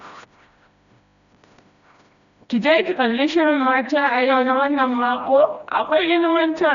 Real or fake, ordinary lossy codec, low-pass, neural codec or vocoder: fake; none; 7.2 kHz; codec, 16 kHz, 1 kbps, FreqCodec, smaller model